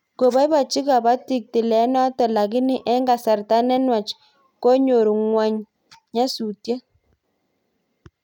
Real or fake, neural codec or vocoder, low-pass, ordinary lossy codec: real; none; 19.8 kHz; none